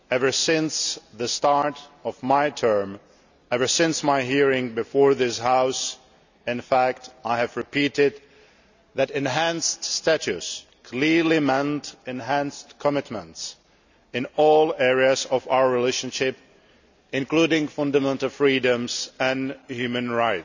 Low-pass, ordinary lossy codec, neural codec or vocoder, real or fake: 7.2 kHz; none; none; real